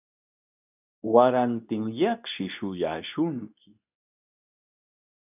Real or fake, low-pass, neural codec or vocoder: fake; 3.6 kHz; codec, 24 kHz, 0.9 kbps, WavTokenizer, medium speech release version 2